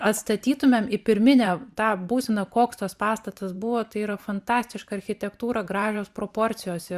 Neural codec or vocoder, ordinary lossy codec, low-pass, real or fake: vocoder, 44.1 kHz, 128 mel bands every 512 samples, BigVGAN v2; Opus, 64 kbps; 14.4 kHz; fake